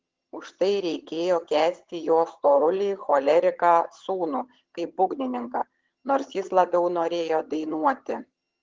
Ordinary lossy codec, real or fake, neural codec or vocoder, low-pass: Opus, 16 kbps; fake; vocoder, 22.05 kHz, 80 mel bands, HiFi-GAN; 7.2 kHz